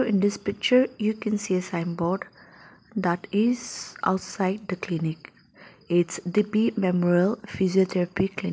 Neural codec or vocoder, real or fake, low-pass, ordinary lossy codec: none; real; none; none